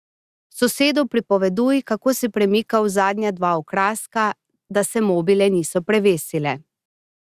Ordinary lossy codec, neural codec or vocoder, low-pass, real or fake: Opus, 64 kbps; none; 14.4 kHz; real